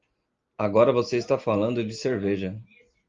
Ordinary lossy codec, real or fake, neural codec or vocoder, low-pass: Opus, 32 kbps; real; none; 7.2 kHz